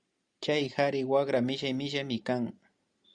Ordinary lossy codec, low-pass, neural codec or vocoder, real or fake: Opus, 64 kbps; 9.9 kHz; none; real